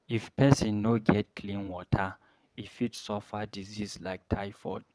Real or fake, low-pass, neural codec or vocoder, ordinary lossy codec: fake; none; vocoder, 22.05 kHz, 80 mel bands, WaveNeXt; none